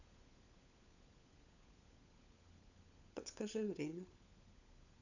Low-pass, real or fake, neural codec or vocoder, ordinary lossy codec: 7.2 kHz; fake; codec, 16 kHz, 16 kbps, FunCodec, trained on LibriTTS, 50 frames a second; none